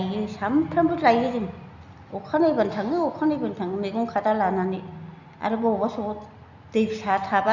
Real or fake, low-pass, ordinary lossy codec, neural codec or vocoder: real; 7.2 kHz; Opus, 64 kbps; none